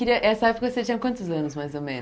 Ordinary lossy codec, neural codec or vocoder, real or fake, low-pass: none; none; real; none